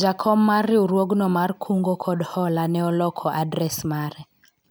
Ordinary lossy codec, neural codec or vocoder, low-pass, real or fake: none; none; none; real